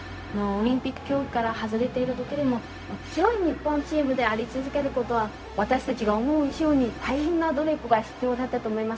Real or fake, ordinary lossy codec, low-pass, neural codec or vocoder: fake; none; none; codec, 16 kHz, 0.4 kbps, LongCat-Audio-Codec